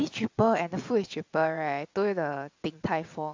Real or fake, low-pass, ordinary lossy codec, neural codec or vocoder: fake; 7.2 kHz; AAC, 48 kbps; vocoder, 22.05 kHz, 80 mel bands, WaveNeXt